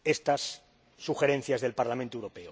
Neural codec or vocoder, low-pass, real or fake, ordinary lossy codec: none; none; real; none